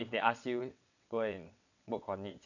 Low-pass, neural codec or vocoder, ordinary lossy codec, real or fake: 7.2 kHz; vocoder, 22.05 kHz, 80 mel bands, WaveNeXt; none; fake